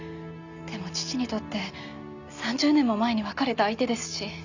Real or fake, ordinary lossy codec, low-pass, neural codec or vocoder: real; none; 7.2 kHz; none